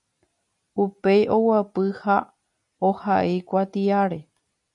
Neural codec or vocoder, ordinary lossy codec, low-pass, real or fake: none; MP3, 96 kbps; 10.8 kHz; real